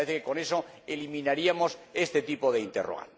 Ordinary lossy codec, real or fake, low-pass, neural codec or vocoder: none; real; none; none